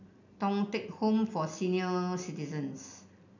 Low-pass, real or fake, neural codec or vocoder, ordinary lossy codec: 7.2 kHz; real; none; none